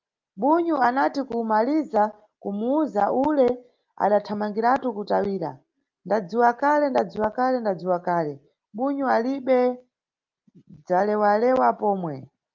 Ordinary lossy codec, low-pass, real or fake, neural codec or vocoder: Opus, 24 kbps; 7.2 kHz; real; none